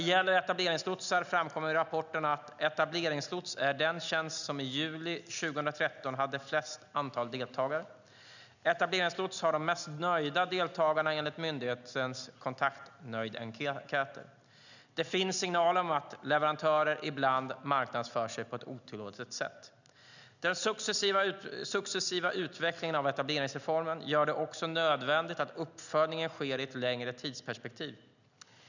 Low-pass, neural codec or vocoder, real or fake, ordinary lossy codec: 7.2 kHz; none; real; none